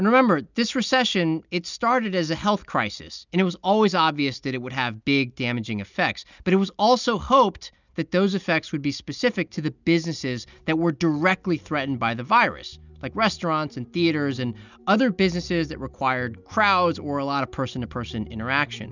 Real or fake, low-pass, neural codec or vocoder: real; 7.2 kHz; none